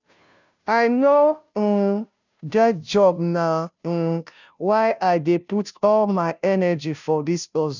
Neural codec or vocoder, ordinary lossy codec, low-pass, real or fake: codec, 16 kHz, 0.5 kbps, FunCodec, trained on Chinese and English, 25 frames a second; none; 7.2 kHz; fake